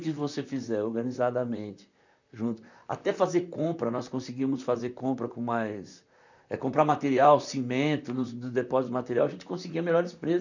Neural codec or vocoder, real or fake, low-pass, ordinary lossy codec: vocoder, 44.1 kHz, 128 mel bands, Pupu-Vocoder; fake; 7.2 kHz; none